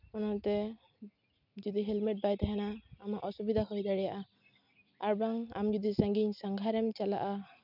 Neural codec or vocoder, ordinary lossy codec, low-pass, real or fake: none; none; 5.4 kHz; real